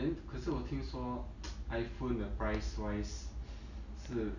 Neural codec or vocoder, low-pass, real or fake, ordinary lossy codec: none; 7.2 kHz; real; none